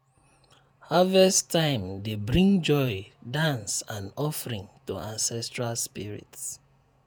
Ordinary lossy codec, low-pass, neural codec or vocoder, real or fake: none; none; vocoder, 48 kHz, 128 mel bands, Vocos; fake